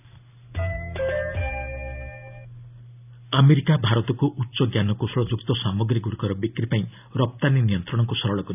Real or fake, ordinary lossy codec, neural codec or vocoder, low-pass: real; none; none; 3.6 kHz